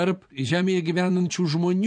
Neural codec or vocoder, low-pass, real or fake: vocoder, 24 kHz, 100 mel bands, Vocos; 9.9 kHz; fake